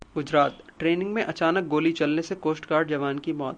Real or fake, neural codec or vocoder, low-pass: real; none; 9.9 kHz